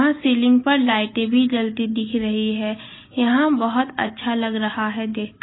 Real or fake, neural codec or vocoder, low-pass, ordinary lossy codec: real; none; 7.2 kHz; AAC, 16 kbps